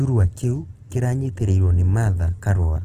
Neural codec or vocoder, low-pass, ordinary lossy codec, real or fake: none; 14.4 kHz; Opus, 16 kbps; real